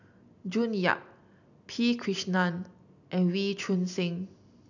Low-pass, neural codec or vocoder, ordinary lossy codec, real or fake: 7.2 kHz; none; none; real